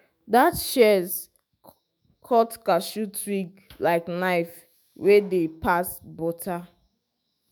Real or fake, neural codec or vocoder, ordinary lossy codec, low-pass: fake; autoencoder, 48 kHz, 128 numbers a frame, DAC-VAE, trained on Japanese speech; none; none